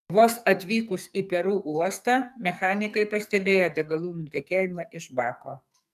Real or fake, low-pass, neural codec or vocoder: fake; 14.4 kHz; codec, 44.1 kHz, 2.6 kbps, SNAC